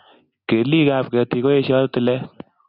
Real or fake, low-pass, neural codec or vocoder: real; 5.4 kHz; none